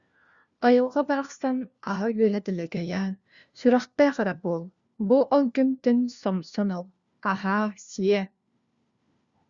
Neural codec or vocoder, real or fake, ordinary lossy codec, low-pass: codec, 16 kHz, 1 kbps, FunCodec, trained on LibriTTS, 50 frames a second; fake; Opus, 64 kbps; 7.2 kHz